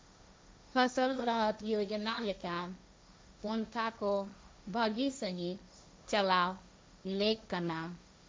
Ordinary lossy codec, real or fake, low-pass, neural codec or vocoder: none; fake; none; codec, 16 kHz, 1.1 kbps, Voila-Tokenizer